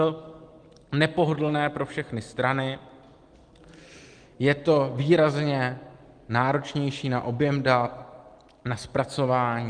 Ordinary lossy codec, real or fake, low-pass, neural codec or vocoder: Opus, 24 kbps; real; 9.9 kHz; none